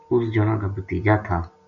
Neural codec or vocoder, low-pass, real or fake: none; 7.2 kHz; real